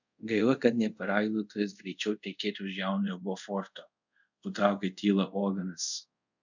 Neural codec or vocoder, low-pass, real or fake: codec, 24 kHz, 0.5 kbps, DualCodec; 7.2 kHz; fake